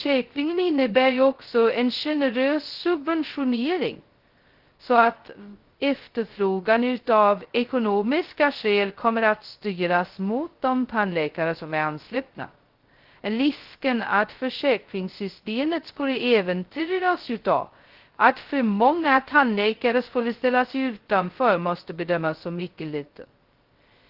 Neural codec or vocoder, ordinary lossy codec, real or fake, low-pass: codec, 16 kHz, 0.2 kbps, FocalCodec; Opus, 16 kbps; fake; 5.4 kHz